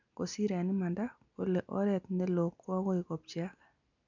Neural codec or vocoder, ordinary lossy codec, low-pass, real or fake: none; none; 7.2 kHz; real